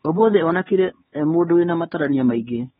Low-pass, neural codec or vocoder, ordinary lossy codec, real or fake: 7.2 kHz; codec, 16 kHz, 4 kbps, X-Codec, HuBERT features, trained on LibriSpeech; AAC, 16 kbps; fake